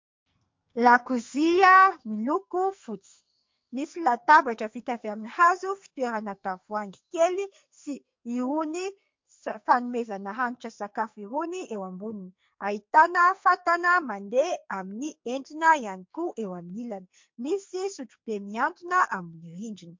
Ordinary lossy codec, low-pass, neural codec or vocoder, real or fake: MP3, 48 kbps; 7.2 kHz; codec, 44.1 kHz, 2.6 kbps, SNAC; fake